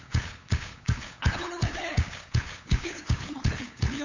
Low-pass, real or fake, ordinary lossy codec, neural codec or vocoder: 7.2 kHz; fake; AAC, 48 kbps; codec, 16 kHz, 8 kbps, FunCodec, trained on LibriTTS, 25 frames a second